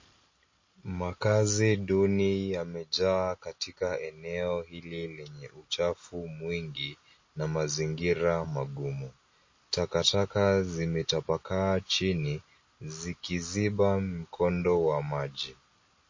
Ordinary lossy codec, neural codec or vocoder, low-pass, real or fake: MP3, 32 kbps; none; 7.2 kHz; real